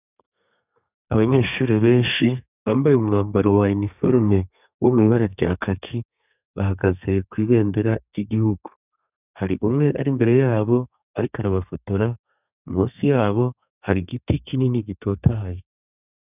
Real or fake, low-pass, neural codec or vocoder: fake; 3.6 kHz; codec, 32 kHz, 1.9 kbps, SNAC